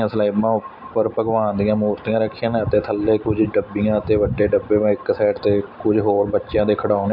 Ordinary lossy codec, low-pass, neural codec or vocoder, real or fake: AAC, 48 kbps; 5.4 kHz; none; real